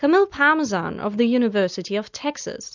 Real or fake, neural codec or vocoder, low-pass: real; none; 7.2 kHz